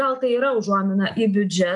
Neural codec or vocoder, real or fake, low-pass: none; real; 10.8 kHz